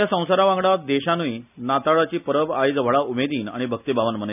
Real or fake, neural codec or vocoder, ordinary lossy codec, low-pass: real; none; none; 3.6 kHz